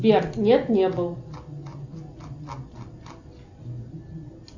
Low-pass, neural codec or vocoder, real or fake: 7.2 kHz; none; real